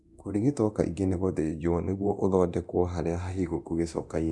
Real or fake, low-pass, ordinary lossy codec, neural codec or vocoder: fake; none; none; codec, 24 kHz, 0.9 kbps, DualCodec